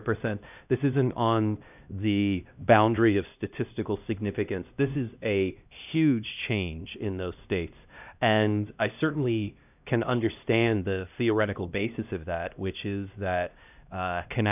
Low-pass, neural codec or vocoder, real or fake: 3.6 kHz; codec, 16 kHz, 1 kbps, X-Codec, HuBERT features, trained on LibriSpeech; fake